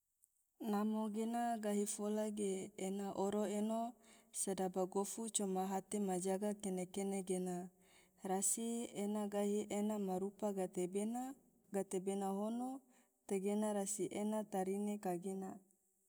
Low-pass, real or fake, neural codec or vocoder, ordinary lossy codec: none; real; none; none